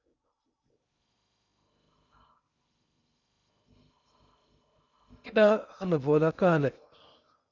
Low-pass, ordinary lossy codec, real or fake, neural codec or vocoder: 7.2 kHz; Opus, 64 kbps; fake; codec, 16 kHz in and 24 kHz out, 0.6 kbps, FocalCodec, streaming, 2048 codes